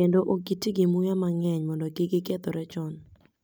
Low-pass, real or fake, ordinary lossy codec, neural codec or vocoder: none; real; none; none